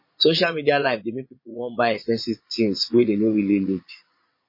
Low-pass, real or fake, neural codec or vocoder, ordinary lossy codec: 5.4 kHz; fake; vocoder, 22.05 kHz, 80 mel bands, Vocos; MP3, 24 kbps